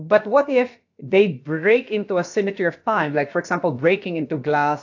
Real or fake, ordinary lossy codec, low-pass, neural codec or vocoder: fake; AAC, 48 kbps; 7.2 kHz; codec, 16 kHz, about 1 kbps, DyCAST, with the encoder's durations